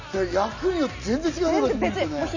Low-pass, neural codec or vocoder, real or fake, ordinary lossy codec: 7.2 kHz; none; real; none